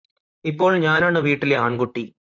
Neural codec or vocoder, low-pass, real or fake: vocoder, 44.1 kHz, 128 mel bands, Pupu-Vocoder; 7.2 kHz; fake